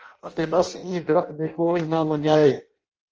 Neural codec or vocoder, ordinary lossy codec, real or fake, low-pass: codec, 16 kHz in and 24 kHz out, 0.6 kbps, FireRedTTS-2 codec; Opus, 24 kbps; fake; 7.2 kHz